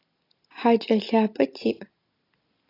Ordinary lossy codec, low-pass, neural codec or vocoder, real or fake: AAC, 32 kbps; 5.4 kHz; none; real